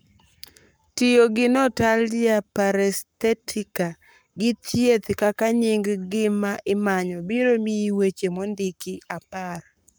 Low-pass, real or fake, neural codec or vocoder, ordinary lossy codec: none; fake; codec, 44.1 kHz, 7.8 kbps, Pupu-Codec; none